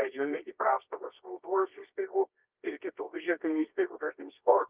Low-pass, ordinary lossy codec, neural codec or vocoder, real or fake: 3.6 kHz; Opus, 32 kbps; codec, 24 kHz, 0.9 kbps, WavTokenizer, medium music audio release; fake